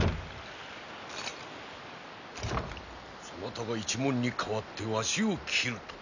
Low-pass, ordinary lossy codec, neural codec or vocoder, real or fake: 7.2 kHz; none; none; real